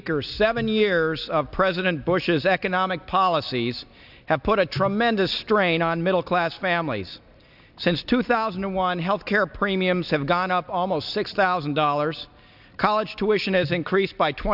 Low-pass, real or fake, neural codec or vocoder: 5.4 kHz; real; none